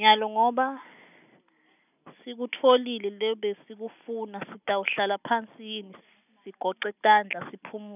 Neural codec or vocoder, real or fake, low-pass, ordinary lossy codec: none; real; 3.6 kHz; none